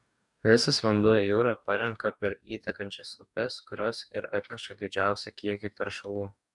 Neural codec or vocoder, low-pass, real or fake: codec, 44.1 kHz, 2.6 kbps, DAC; 10.8 kHz; fake